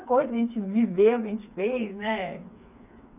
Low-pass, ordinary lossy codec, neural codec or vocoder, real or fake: 3.6 kHz; none; codec, 16 kHz, 4 kbps, FreqCodec, smaller model; fake